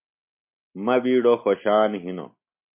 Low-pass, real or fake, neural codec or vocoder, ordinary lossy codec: 3.6 kHz; real; none; MP3, 32 kbps